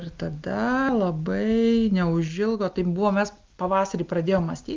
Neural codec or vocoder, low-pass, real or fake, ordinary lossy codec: none; 7.2 kHz; real; Opus, 24 kbps